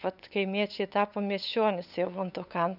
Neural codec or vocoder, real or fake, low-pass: codec, 16 kHz, 8 kbps, FunCodec, trained on LibriTTS, 25 frames a second; fake; 5.4 kHz